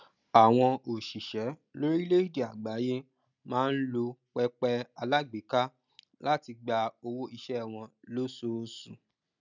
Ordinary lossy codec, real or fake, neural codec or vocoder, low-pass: none; real; none; 7.2 kHz